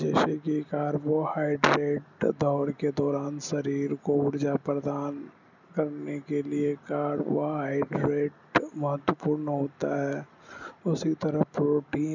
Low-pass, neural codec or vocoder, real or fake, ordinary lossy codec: 7.2 kHz; none; real; none